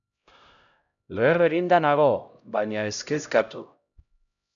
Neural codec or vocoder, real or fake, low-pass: codec, 16 kHz, 0.5 kbps, X-Codec, HuBERT features, trained on LibriSpeech; fake; 7.2 kHz